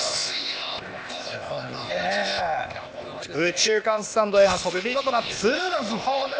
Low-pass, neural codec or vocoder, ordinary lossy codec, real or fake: none; codec, 16 kHz, 0.8 kbps, ZipCodec; none; fake